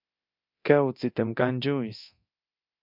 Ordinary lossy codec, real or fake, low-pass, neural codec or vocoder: MP3, 48 kbps; fake; 5.4 kHz; codec, 24 kHz, 0.9 kbps, DualCodec